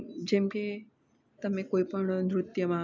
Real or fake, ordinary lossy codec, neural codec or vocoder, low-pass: real; none; none; 7.2 kHz